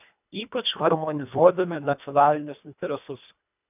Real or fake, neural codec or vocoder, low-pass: fake; codec, 24 kHz, 1.5 kbps, HILCodec; 3.6 kHz